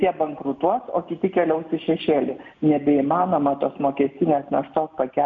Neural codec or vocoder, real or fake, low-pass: none; real; 7.2 kHz